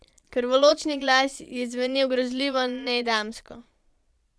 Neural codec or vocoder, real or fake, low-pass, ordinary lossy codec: vocoder, 22.05 kHz, 80 mel bands, Vocos; fake; none; none